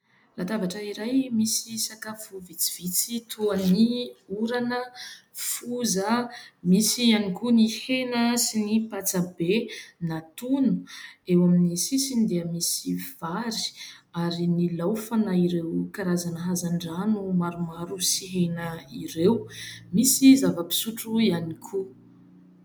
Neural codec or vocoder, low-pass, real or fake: none; 19.8 kHz; real